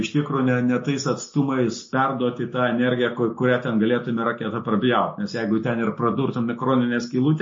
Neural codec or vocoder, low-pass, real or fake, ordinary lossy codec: none; 7.2 kHz; real; MP3, 32 kbps